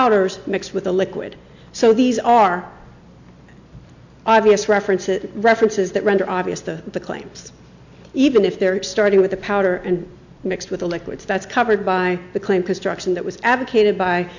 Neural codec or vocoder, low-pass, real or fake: none; 7.2 kHz; real